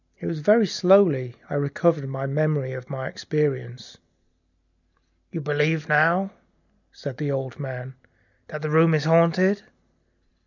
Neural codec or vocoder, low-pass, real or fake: none; 7.2 kHz; real